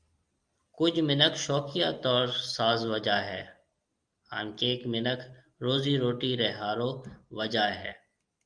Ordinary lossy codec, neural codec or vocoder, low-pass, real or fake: Opus, 32 kbps; none; 9.9 kHz; real